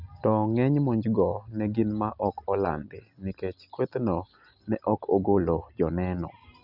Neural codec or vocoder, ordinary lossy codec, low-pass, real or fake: none; none; 5.4 kHz; real